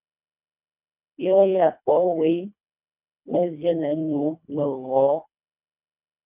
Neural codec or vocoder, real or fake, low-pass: codec, 24 kHz, 1.5 kbps, HILCodec; fake; 3.6 kHz